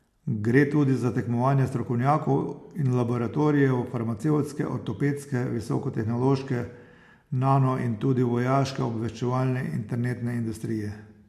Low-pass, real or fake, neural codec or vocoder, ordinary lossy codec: 14.4 kHz; real; none; MP3, 64 kbps